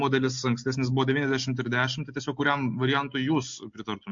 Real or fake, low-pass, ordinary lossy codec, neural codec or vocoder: real; 7.2 kHz; MP3, 48 kbps; none